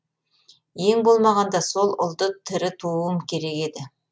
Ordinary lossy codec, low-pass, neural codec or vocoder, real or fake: none; none; none; real